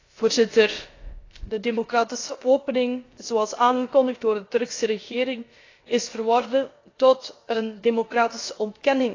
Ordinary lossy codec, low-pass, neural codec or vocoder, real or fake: AAC, 32 kbps; 7.2 kHz; codec, 16 kHz, about 1 kbps, DyCAST, with the encoder's durations; fake